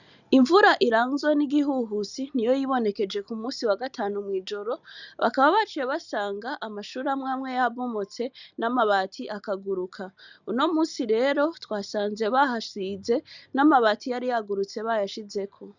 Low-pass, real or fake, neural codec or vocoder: 7.2 kHz; real; none